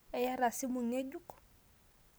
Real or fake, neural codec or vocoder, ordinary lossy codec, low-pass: real; none; none; none